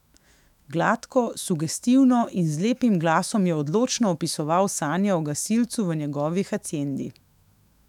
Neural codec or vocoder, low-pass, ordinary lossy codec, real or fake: autoencoder, 48 kHz, 128 numbers a frame, DAC-VAE, trained on Japanese speech; 19.8 kHz; none; fake